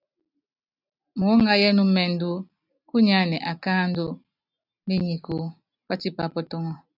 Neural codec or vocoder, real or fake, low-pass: none; real; 5.4 kHz